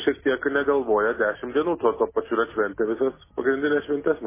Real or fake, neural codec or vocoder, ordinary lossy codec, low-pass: real; none; MP3, 16 kbps; 3.6 kHz